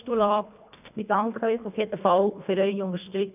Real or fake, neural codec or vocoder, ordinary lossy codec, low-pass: fake; codec, 24 kHz, 1.5 kbps, HILCodec; none; 3.6 kHz